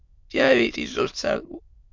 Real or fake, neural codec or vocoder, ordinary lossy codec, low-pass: fake; autoencoder, 22.05 kHz, a latent of 192 numbers a frame, VITS, trained on many speakers; MP3, 48 kbps; 7.2 kHz